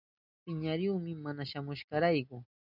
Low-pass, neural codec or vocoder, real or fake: 5.4 kHz; none; real